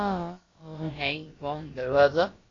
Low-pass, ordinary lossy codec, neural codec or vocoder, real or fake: 7.2 kHz; AAC, 32 kbps; codec, 16 kHz, about 1 kbps, DyCAST, with the encoder's durations; fake